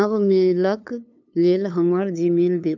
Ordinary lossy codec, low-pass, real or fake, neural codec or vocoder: none; 7.2 kHz; fake; codec, 16 kHz, 2 kbps, FunCodec, trained on LibriTTS, 25 frames a second